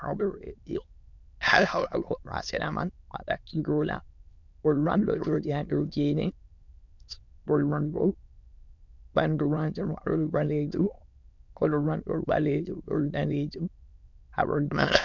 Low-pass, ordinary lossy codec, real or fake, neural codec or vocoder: 7.2 kHz; MP3, 64 kbps; fake; autoencoder, 22.05 kHz, a latent of 192 numbers a frame, VITS, trained on many speakers